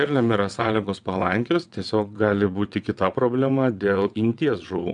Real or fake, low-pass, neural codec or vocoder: fake; 9.9 kHz; vocoder, 22.05 kHz, 80 mel bands, WaveNeXt